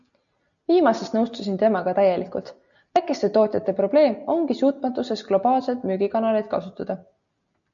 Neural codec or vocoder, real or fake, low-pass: none; real; 7.2 kHz